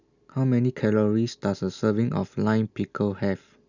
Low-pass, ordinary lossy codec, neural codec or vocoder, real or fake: 7.2 kHz; none; none; real